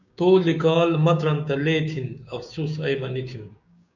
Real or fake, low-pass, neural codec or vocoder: fake; 7.2 kHz; codec, 16 kHz, 6 kbps, DAC